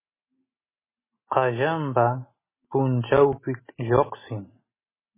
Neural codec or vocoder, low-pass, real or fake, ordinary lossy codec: none; 3.6 kHz; real; MP3, 16 kbps